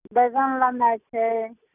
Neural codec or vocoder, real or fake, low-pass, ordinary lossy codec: none; real; 3.6 kHz; none